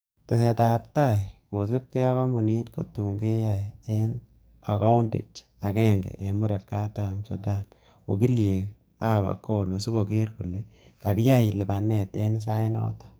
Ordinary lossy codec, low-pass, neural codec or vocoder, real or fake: none; none; codec, 44.1 kHz, 2.6 kbps, SNAC; fake